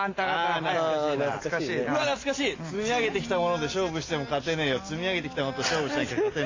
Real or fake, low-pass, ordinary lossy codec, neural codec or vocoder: real; 7.2 kHz; AAC, 32 kbps; none